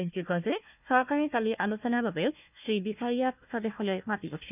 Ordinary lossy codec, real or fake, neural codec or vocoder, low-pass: none; fake; codec, 16 kHz, 1 kbps, FunCodec, trained on Chinese and English, 50 frames a second; 3.6 kHz